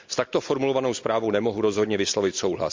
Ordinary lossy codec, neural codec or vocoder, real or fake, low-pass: MP3, 64 kbps; none; real; 7.2 kHz